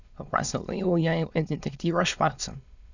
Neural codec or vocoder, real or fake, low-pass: autoencoder, 22.05 kHz, a latent of 192 numbers a frame, VITS, trained on many speakers; fake; 7.2 kHz